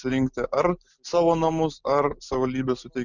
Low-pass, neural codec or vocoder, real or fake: 7.2 kHz; none; real